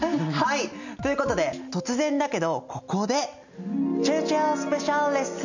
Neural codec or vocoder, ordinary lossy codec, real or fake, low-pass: none; none; real; 7.2 kHz